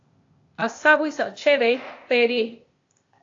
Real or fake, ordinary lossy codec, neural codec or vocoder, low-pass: fake; AAC, 48 kbps; codec, 16 kHz, 0.8 kbps, ZipCodec; 7.2 kHz